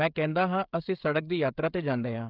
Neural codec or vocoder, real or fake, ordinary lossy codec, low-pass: codec, 16 kHz, 16 kbps, FreqCodec, smaller model; fake; Opus, 24 kbps; 5.4 kHz